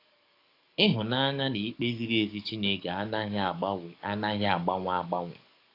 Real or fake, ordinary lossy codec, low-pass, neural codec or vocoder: fake; AAC, 32 kbps; 5.4 kHz; codec, 44.1 kHz, 7.8 kbps, DAC